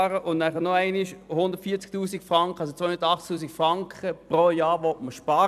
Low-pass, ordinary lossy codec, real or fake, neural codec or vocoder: 14.4 kHz; none; fake; vocoder, 44.1 kHz, 128 mel bands every 256 samples, BigVGAN v2